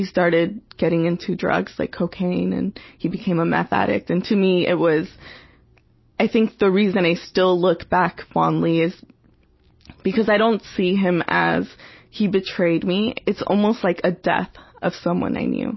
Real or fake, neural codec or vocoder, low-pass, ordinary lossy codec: real; none; 7.2 kHz; MP3, 24 kbps